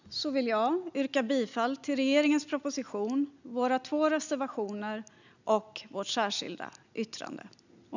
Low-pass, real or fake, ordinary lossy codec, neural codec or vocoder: 7.2 kHz; real; none; none